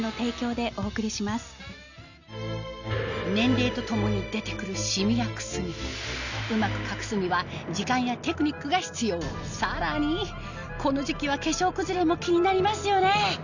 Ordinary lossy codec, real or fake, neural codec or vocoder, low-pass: none; real; none; 7.2 kHz